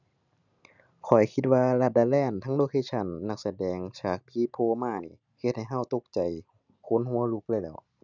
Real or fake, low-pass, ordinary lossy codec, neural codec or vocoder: real; 7.2 kHz; none; none